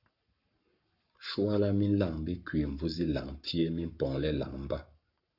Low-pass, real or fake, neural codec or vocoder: 5.4 kHz; fake; codec, 44.1 kHz, 7.8 kbps, Pupu-Codec